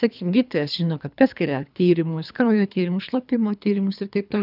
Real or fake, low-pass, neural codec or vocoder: fake; 5.4 kHz; codec, 24 kHz, 3 kbps, HILCodec